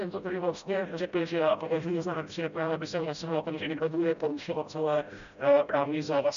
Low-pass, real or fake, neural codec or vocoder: 7.2 kHz; fake; codec, 16 kHz, 0.5 kbps, FreqCodec, smaller model